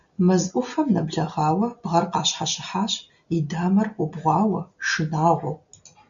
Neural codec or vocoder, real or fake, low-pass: none; real; 7.2 kHz